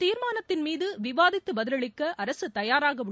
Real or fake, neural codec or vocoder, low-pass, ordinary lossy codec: real; none; none; none